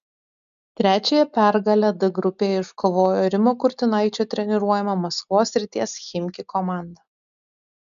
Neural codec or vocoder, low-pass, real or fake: none; 7.2 kHz; real